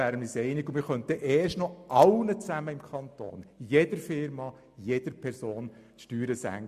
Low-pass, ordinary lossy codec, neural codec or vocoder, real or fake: 14.4 kHz; MP3, 64 kbps; none; real